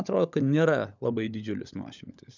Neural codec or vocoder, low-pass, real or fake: codec, 16 kHz, 16 kbps, FunCodec, trained on LibriTTS, 50 frames a second; 7.2 kHz; fake